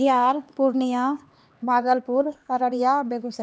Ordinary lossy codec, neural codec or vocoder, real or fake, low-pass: none; codec, 16 kHz, 2 kbps, X-Codec, HuBERT features, trained on LibriSpeech; fake; none